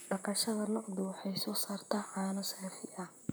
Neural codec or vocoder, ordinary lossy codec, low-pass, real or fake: vocoder, 44.1 kHz, 128 mel bands, Pupu-Vocoder; none; none; fake